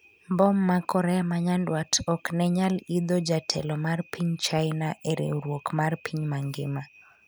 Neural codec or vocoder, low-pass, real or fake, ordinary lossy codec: none; none; real; none